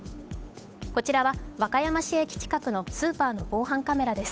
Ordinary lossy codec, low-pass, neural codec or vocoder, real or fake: none; none; codec, 16 kHz, 8 kbps, FunCodec, trained on Chinese and English, 25 frames a second; fake